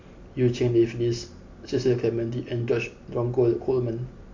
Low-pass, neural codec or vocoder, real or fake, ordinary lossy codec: 7.2 kHz; none; real; MP3, 48 kbps